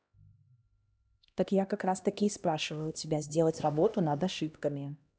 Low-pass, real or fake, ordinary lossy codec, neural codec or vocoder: none; fake; none; codec, 16 kHz, 1 kbps, X-Codec, HuBERT features, trained on LibriSpeech